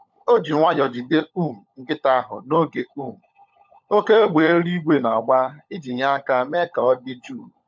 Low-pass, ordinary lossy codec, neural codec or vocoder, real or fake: 7.2 kHz; MP3, 64 kbps; codec, 16 kHz, 16 kbps, FunCodec, trained on LibriTTS, 50 frames a second; fake